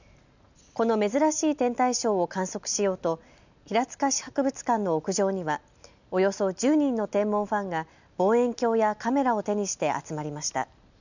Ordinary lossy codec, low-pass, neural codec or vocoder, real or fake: none; 7.2 kHz; none; real